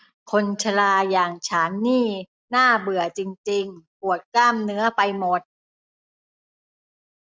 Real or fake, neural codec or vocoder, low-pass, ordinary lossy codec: real; none; none; none